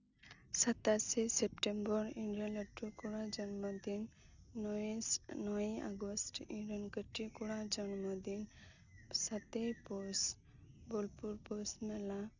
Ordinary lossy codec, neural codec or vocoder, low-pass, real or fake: none; none; 7.2 kHz; real